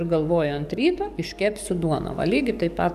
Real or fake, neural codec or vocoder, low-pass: fake; codec, 44.1 kHz, 7.8 kbps, DAC; 14.4 kHz